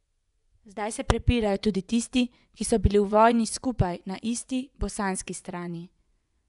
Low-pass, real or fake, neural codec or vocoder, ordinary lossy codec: 10.8 kHz; fake; vocoder, 24 kHz, 100 mel bands, Vocos; none